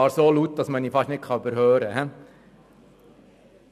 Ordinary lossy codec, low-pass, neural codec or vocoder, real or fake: none; 14.4 kHz; none; real